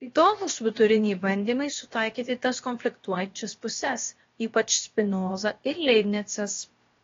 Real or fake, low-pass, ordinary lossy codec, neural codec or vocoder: fake; 7.2 kHz; AAC, 32 kbps; codec, 16 kHz, about 1 kbps, DyCAST, with the encoder's durations